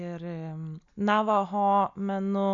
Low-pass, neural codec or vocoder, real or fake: 7.2 kHz; none; real